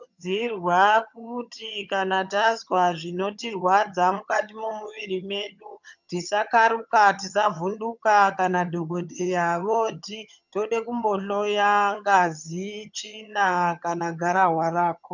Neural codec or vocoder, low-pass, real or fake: vocoder, 22.05 kHz, 80 mel bands, HiFi-GAN; 7.2 kHz; fake